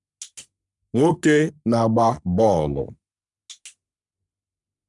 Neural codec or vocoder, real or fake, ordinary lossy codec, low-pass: codec, 44.1 kHz, 3.4 kbps, Pupu-Codec; fake; none; 10.8 kHz